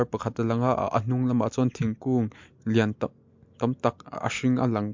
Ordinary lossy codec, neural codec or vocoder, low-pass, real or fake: MP3, 64 kbps; none; 7.2 kHz; real